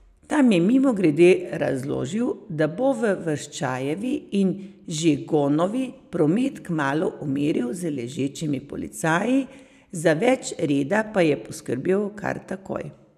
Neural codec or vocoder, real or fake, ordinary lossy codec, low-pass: none; real; none; 14.4 kHz